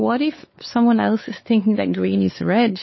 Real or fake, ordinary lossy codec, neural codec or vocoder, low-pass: fake; MP3, 24 kbps; codec, 16 kHz, 4 kbps, X-Codec, WavLM features, trained on Multilingual LibriSpeech; 7.2 kHz